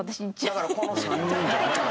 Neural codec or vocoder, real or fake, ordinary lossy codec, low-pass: none; real; none; none